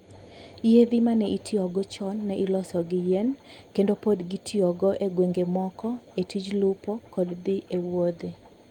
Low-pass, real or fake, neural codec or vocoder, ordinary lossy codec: 19.8 kHz; real; none; Opus, 32 kbps